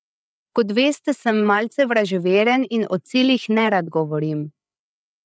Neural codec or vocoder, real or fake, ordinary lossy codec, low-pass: codec, 16 kHz, 8 kbps, FreqCodec, larger model; fake; none; none